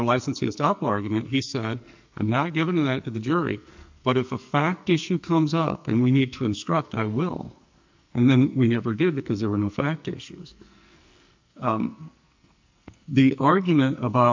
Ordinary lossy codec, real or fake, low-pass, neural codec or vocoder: MP3, 64 kbps; fake; 7.2 kHz; codec, 44.1 kHz, 2.6 kbps, SNAC